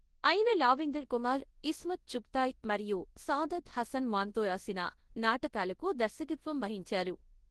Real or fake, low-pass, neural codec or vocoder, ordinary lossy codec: fake; 10.8 kHz; codec, 24 kHz, 0.9 kbps, WavTokenizer, large speech release; Opus, 16 kbps